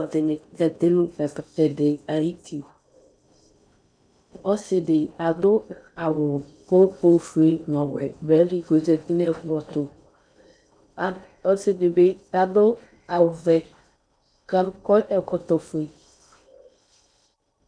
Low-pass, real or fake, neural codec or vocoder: 9.9 kHz; fake; codec, 16 kHz in and 24 kHz out, 0.6 kbps, FocalCodec, streaming, 4096 codes